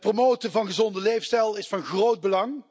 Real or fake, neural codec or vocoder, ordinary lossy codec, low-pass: real; none; none; none